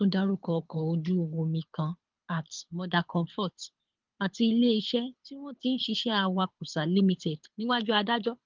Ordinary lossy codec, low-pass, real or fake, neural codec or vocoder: Opus, 32 kbps; 7.2 kHz; fake; codec, 24 kHz, 6 kbps, HILCodec